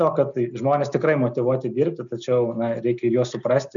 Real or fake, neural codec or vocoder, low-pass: real; none; 7.2 kHz